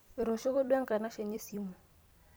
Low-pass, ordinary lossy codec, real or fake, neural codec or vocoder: none; none; fake; vocoder, 44.1 kHz, 128 mel bands every 256 samples, BigVGAN v2